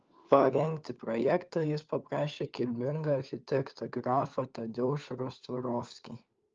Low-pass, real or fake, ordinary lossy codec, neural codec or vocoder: 7.2 kHz; fake; Opus, 24 kbps; codec, 16 kHz, 4 kbps, FunCodec, trained on LibriTTS, 50 frames a second